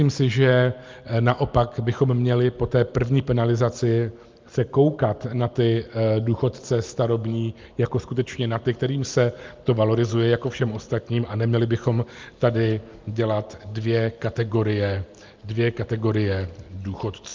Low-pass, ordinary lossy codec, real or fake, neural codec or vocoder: 7.2 kHz; Opus, 24 kbps; real; none